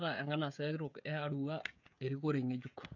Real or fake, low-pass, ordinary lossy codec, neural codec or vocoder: fake; 7.2 kHz; none; codec, 16 kHz, 16 kbps, FreqCodec, smaller model